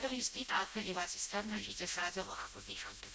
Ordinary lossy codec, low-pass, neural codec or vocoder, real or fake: none; none; codec, 16 kHz, 0.5 kbps, FreqCodec, smaller model; fake